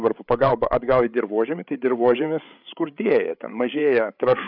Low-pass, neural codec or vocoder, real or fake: 5.4 kHz; codec, 16 kHz, 8 kbps, FreqCodec, larger model; fake